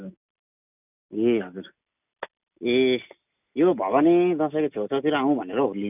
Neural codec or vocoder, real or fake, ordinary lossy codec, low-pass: none; real; none; 3.6 kHz